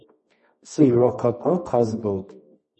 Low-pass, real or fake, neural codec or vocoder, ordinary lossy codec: 10.8 kHz; fake; codec, 24 kHz, 0.9 kbps, WavTokenizer, medium music audio release; MP3, 32 kbps